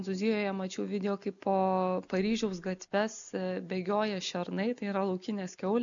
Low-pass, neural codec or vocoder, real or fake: 7.2 kHz; none; real